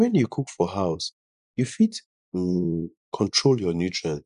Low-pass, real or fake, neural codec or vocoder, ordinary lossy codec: 10.8 kHz; fake; vocoder, 24 kHz, 100 mel bands, Vocos; none